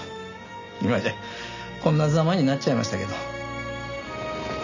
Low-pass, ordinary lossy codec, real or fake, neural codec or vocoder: 7.2 kHz; none; real; none